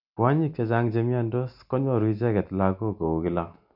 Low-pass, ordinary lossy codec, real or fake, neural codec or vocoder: 5.4 kHz; none; real; none